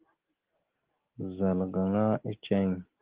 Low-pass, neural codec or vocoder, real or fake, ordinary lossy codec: 3.6 kHz; none; real; Opus, 16 kbps